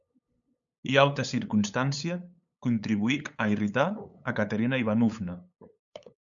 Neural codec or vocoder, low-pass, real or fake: codec, 16 kHz, 8 kbps, FunCodec, trained on LibriTTS, 25 frames a second; 7.2 kHz; fake